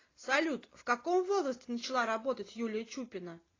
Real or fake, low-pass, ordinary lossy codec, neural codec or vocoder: real; 7.2 kHz; AAC, 32 kbps; none